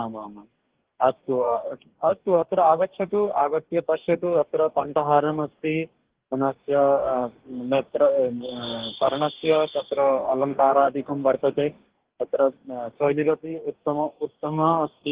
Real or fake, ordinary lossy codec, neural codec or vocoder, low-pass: fake; Opus, 16 kbps; codec, 44.1 kHz, 2.6 kbps, DAC; 3.6 kHz